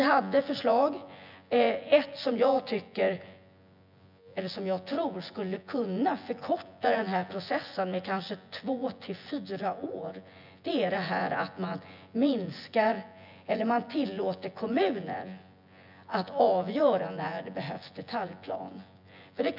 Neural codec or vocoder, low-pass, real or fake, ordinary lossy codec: vocoder, 24 kHz, 100 mel bands, Vocos; 5.4 kHz; fake; none